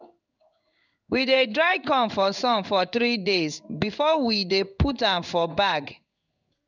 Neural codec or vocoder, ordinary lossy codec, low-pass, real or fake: codec, 16 kHz in and 24 kHz out, 1 kbps, XY-Tokenizer; none; 7.2 kHz; fake